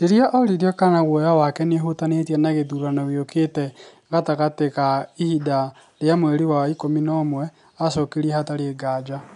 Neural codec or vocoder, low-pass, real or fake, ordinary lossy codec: none; 10.8 kHz; real; none